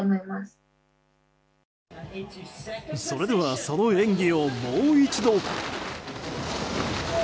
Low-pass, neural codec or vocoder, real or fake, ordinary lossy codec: none; none; real; none